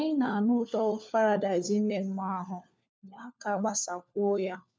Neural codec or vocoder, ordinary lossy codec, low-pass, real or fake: codec, 16 kHz, 4 kbps, FunCodec, trained on LibriTTS, 50 frames a second; none; none; fake